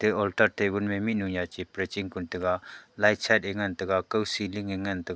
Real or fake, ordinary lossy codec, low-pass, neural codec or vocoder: real; none; none; none